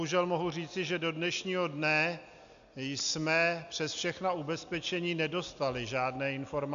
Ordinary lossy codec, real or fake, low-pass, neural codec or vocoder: AAC, 96 kbps; real; 7.2 kHz; none